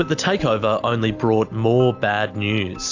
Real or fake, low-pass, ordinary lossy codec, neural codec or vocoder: real; 7.2 kHz; AAC, 48 kbps; none